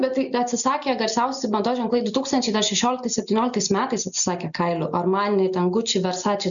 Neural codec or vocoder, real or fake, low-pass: none; real; 7.2 kHz